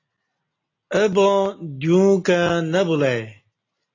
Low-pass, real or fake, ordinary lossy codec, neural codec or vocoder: 7.2 kHz; real; AAC, 32 kbps; none